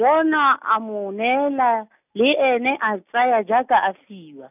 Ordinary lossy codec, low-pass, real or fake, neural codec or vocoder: none; 3.6 kHz; real; none